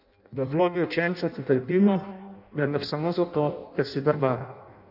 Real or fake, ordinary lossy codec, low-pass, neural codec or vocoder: fake; none; 5.4 kHz; codec, 16 kHz in and 24 kHz out, 0.6 kbps, FireRedTTS-2 codec